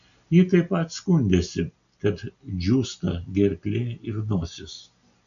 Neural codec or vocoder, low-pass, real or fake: none; 7.2 kHz; real